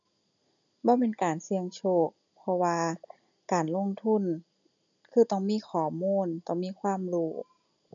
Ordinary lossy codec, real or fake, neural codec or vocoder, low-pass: none; real; none; 7.2 kHz